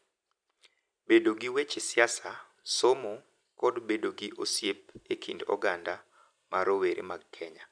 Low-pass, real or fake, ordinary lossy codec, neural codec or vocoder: 9.9 kHz; real; none; none